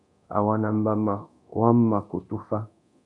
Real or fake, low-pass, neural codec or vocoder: fake; 10.8 kHz; codec, 24 kHz, 0.9 kbps, DualCodec